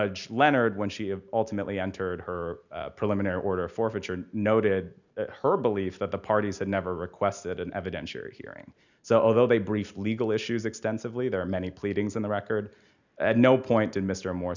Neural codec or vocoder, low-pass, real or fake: none; 7.2 kHz; real